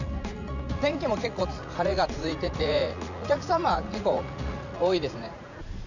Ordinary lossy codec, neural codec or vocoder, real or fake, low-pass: none; vocoder, 44.1 kHz, 80 mel bands, Vocos; fake; 7.2 kHz